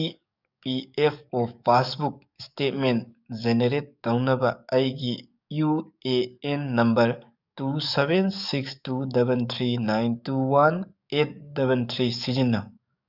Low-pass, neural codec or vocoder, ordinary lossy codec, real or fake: 5.4 kHz; codec, 44.1 kHz, 7.8 kbps, DAC; none; fake